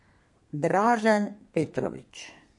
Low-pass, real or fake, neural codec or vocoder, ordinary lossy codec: 10.8 kHz; fake; codec, 32 kHz, 1.9 kbps, SNAC; MP3, 48 kbps